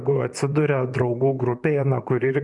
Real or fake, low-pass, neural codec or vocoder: fake; 10.8 kHz; vocoder, 44.1 kHz, 128 mel bands, Pupu-Vocoder